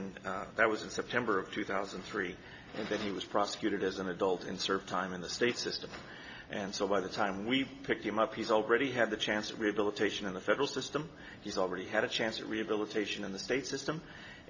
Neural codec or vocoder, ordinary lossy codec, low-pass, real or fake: none; AAC, 48 kbps; 7.2 kHz; real